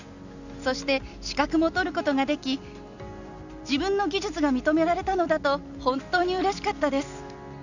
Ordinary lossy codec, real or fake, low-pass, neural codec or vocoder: none; real; 7.2 kHz; none